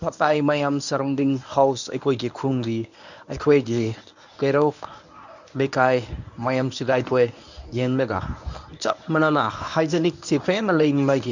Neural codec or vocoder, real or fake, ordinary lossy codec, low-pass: codec, 24 kHz, 0.9 kbps, WavTokenizer, medium speech release version 1; fake; none; 7.2 kHz